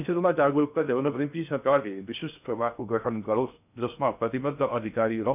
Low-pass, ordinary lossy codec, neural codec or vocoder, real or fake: 3.6 kHz; none; codec, 16 kHz in and 24 kHz out, 0.6 kbps, FocalCodec, streaming, 2048 codes; fake